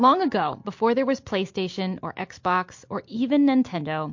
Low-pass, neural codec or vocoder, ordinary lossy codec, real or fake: 7.2 kHz; none; MP3, 48 kbps; real